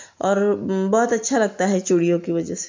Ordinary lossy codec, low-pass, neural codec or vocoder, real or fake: MP3, 64 kbps; 7.2 kHz; none; real